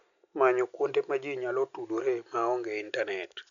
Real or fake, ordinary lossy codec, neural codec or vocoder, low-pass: real; none; none; 7.2 kHz